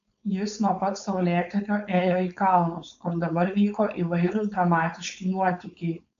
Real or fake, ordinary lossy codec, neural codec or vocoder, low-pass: fake; MP3, 64 kbps; codec, 16 kHz, 4.8 kbps, FACodec; 7.2 kHz